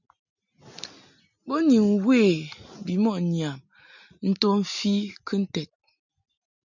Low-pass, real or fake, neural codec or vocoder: 7.2 kHz; real; none